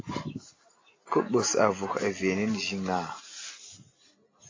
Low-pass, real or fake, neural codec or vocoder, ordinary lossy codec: 7.2 kHz; real; none; AAC, 32 kbps